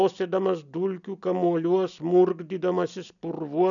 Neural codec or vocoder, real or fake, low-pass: none; real; 7.2 kHz